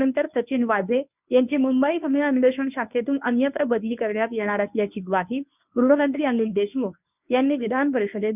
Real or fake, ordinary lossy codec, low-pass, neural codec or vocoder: fake; none; 3.6 kHz; codec, 24 kHz, 0.9 kbps, WavTokenizer, medium speech release version 1